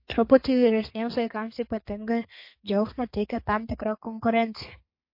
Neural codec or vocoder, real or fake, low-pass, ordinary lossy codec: codec, 32 kHz, 1.9 kbps, SNAC; fake; 5.4 kHz; MP3, 32 kbps